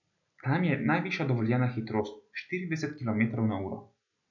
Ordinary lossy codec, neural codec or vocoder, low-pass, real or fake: none; none; 7.2 kHz; real